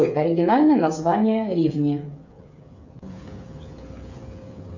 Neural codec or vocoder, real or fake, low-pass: codec, 16 kHz, 8 kbps, FreqCodec, smaller model; fake; 7.2 kHz